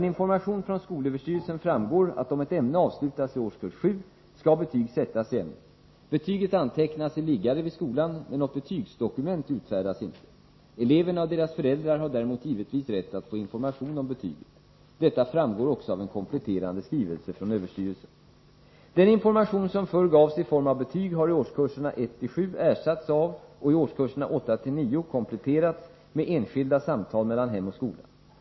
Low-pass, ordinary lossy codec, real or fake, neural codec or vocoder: 7.2 kHz; MP3, 24 kbps; real; none